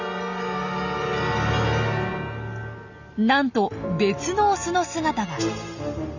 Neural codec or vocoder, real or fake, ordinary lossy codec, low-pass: none; real; none; 7.2 kHz